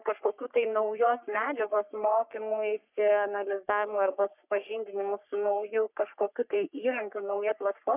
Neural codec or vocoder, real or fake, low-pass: codec, 44.1 kHz, 3.4 kbps, Pupu-Codec; fake; 3.6 kHz